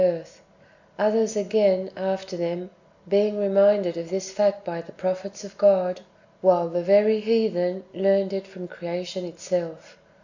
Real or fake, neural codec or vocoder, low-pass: real; none; 7.2 kHz